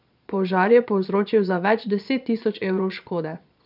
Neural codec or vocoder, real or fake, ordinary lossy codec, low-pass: vocoder, 22.05 kHz, 80 mel bands, Vocos; fake; none; 5.4 kHz